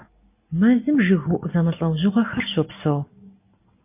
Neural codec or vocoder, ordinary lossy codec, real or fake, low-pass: none; MP3, 24 kbps; real; 3.6 kHz